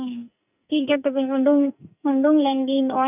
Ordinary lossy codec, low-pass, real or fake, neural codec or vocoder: none; 3.6 kHz; fake; codec, 44.1 kHz, 2.6 kbps, SNAC